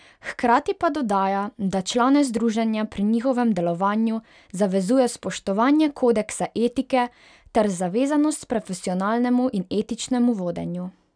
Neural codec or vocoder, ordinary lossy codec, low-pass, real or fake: none; none; 9.9 kHz; real